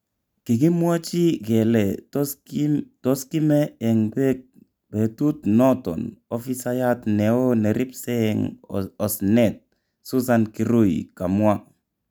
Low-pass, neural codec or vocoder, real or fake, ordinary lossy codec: none; none; real; none